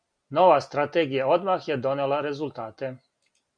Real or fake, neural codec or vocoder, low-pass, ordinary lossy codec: real; none; 9.9 kHz; MP3, 64 kbps